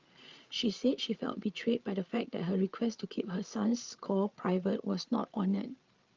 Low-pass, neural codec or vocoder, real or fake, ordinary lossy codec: 7.2 kHz; codec, 16 kHz, 8 kbps, FreqCodec, larger model; fake; Opus, 32 kbps